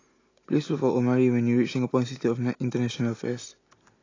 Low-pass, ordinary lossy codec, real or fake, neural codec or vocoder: 7.2 kHz; AAC, 32 kbps; real; none